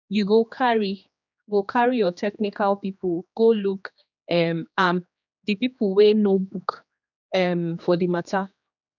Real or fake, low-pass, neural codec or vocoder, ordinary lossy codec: fake; 7.2 kHz; codec, 16 kHz, 2 kbps, X-Codec, HuBERT features, trained on general audio; none